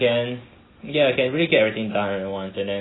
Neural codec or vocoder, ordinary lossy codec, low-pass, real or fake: none; AAC, 16 kbps; 7.2 kHz; real